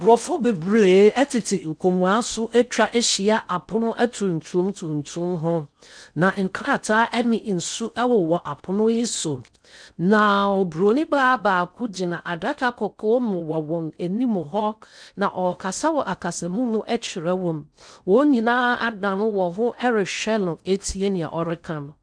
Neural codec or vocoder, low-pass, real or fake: codec, 16 kHz in and 24 kHz out, 0.6 kbps, FocalCodec, streaming, 4096 codes; 9.9 kHz; fake